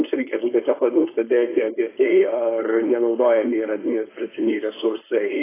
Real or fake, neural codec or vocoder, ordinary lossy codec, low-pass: fake; codec, 16 kHz, 4.8 kbps, FACodec; AAC, 16 kbps; 3.6 kHz